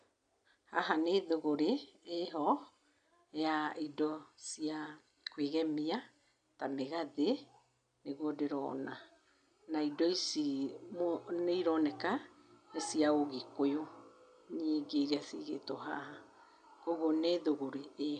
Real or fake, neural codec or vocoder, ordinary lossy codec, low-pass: real; none; none; 9.9 kHz